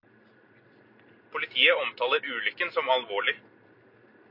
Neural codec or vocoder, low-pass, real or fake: none; 5.4 kHz; real